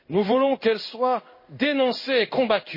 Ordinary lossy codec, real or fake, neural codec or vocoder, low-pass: MP3, 24 kbps; fake; codec, 16 kHz in and 24 kHz out, 1 kbps, XY-Tokenizer; 5.4 kHz